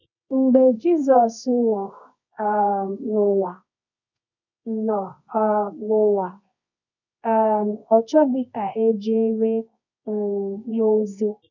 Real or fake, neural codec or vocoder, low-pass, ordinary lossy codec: fake; codec, 24 kHz, 0.9 kbps, WavTokenizer, medium music audio release; 7.2 kHz; none